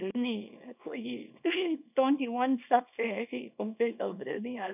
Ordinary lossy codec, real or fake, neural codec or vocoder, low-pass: none; fake; codec, 24 kHz, 0.9 kbps, WavTokenizer, small release; 3.6 kHz